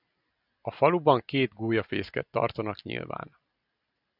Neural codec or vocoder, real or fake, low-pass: none; real; 5.4 kHz